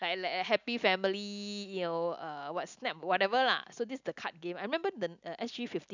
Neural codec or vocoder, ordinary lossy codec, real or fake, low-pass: none; none; real; 7.2 kHz